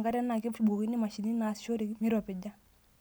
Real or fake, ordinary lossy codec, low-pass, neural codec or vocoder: real; none; none; none